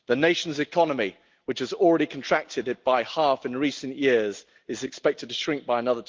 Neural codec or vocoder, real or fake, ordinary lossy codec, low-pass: none; real; Opus, 32 kbps; 7.2 kHz